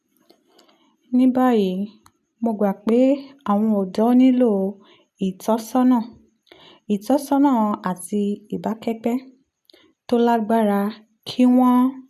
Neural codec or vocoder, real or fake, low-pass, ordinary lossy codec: none; real; 14.4 kHz; none